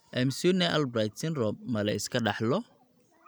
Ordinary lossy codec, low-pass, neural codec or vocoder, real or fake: none; none; none; real